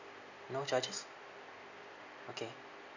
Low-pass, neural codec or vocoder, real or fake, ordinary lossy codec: 7.2 kHz; none; real; none